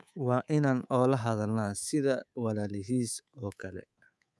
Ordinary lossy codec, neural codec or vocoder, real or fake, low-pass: none; codec, 24 kHz, 3.1 kbps, DualCodec; fake; none